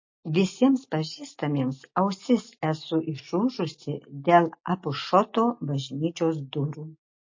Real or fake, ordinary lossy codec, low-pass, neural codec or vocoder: real; MP3, 32 kbps; 7.2 kHz; none